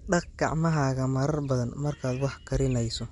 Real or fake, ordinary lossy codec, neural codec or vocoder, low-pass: real; MP3, 64 kbps; none; 10.8 kHz